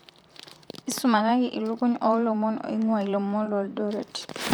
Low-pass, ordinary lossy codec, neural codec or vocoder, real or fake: none; none; vocoder, 44.1 kHz, 128 mel bands, Pupu-Vocoder; fake